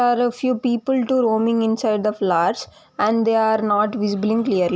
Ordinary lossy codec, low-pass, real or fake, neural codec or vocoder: none; none; real; none